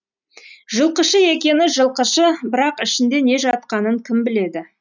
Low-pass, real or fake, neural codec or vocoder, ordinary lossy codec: 7.2 kHz; real; none; none